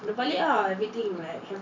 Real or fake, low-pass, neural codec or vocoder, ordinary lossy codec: fake; 7.2 kHz; vocoder, 44.1 kHz, 128 mel bands every 512 samples, BigVGAN v2; MP3, 64 kbps